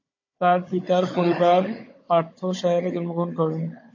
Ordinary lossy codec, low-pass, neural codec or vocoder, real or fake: MP3, 32 kbps; 7.2 kHz; codec, 16 kHz, 16 kbps, FunCodec, trained on Chinese and English, 50 frames a second; fake